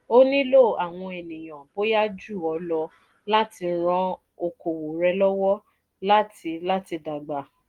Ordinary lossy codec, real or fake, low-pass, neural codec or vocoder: Opus, 24 kbps; real; 19.8 kHz; none